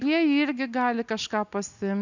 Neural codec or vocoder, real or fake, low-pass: none; real; 7.2 kHz